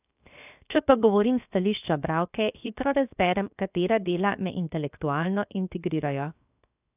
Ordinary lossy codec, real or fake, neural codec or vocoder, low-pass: none; fake; codec, 16 kHz, 0.7 kbps, FocalCodec; 3.6 kHz